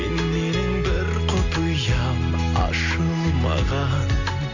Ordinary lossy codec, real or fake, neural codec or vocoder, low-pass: none; real; none; 7.2 kHz